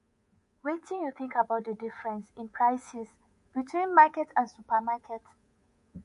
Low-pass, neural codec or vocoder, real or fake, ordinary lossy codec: 10.8 kHz; codec, 24 kHz, 3.1 kbps, DualCodec; fake; MP3, 48 kbps